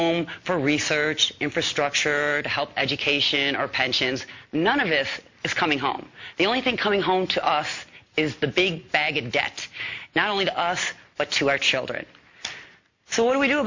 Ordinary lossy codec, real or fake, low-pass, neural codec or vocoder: MP3, 48 kbps; real; 7.2 kHz; none